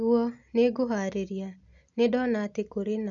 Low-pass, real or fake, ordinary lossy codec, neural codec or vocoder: 7.2 kHz; real; none; none